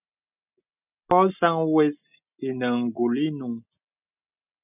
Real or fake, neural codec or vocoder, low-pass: real; none; 3.6 kHz